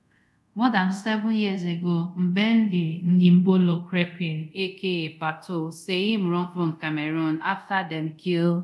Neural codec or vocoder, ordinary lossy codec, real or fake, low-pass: codec, 24 kHz, 0.5 kbps, DualCodec; none; fake; none